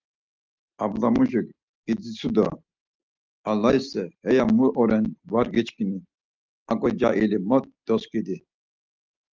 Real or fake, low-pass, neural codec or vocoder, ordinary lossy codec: real; 7.2 kHz; none; Opus, 32 kbps